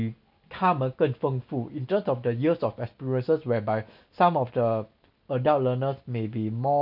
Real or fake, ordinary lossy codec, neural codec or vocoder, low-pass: real; none; none; 5.4 kHz